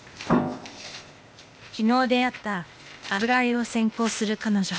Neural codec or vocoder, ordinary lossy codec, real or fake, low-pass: codec, 16 kHz, 0.8 kbps, ZipCodec; none; fake; none